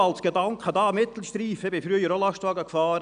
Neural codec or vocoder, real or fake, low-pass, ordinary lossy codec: none; real; 9.9 kHz; none